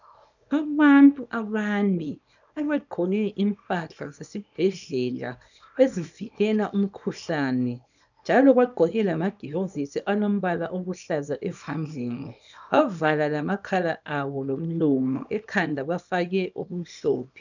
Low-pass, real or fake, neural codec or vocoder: 7.2 kHz; fake; codec, 24 kHz, 0.9 kbps, WavTokenizer, small release